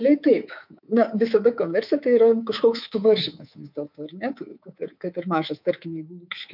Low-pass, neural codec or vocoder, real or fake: 5.4 kHz; codec, 24 kHz, 3.1 kbps, DualCodec; fake